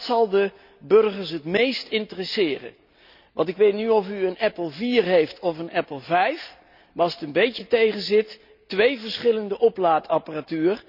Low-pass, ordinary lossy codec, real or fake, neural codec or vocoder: 5.4 kHz; none; real; none